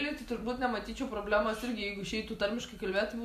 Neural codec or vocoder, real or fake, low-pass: none; real; 14.4 kHz